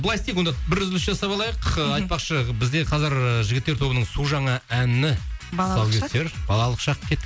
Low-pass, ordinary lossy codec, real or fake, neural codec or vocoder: none; none; real; none